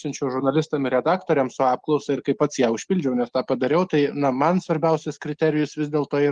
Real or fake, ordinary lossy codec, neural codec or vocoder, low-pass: real; Opus, 24 kbps; none; 9.9 kHz